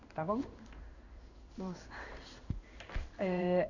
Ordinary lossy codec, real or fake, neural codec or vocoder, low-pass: none; fake; codec, 16 kHz in and 24 kHz out, 1 kbps, XY-Tokenizer; 7.2 kHz